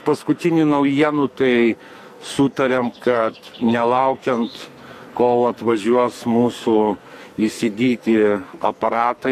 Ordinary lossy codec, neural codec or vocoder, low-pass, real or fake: AAC, 64 kbps; codec, 44.1 kHz, 2.6 kbps, SNAC; 14.4 kHz; fake